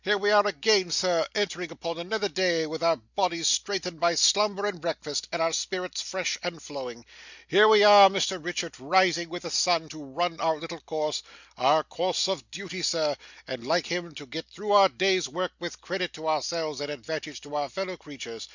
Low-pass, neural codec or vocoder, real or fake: 7.2 kHz; none; real